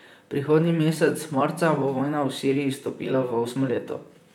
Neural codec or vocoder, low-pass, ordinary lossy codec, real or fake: vocoder, 44.1 kHz, 128 mel bands, Pupu-Vocoder; 19.8 kHz; none; fake